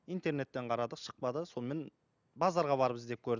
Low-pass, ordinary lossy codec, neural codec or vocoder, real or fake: 7.2 kHz; none; none; real